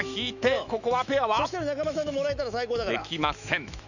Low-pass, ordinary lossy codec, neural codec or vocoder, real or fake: 7.2 kHz; none; autoencoder, 48 kHz, 128 numbers a frame, DAC-VAE, trained on Japanese speech; fake